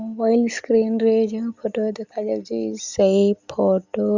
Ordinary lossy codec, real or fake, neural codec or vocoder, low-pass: Opus, 64 kbps; real; none; 7.2 kHz